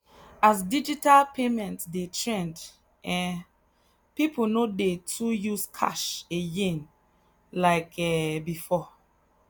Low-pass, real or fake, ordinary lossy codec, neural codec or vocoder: none; real; none; none